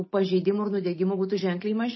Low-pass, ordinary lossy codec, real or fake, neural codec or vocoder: 7.2 kHz; MP3, 24 kbps; real; none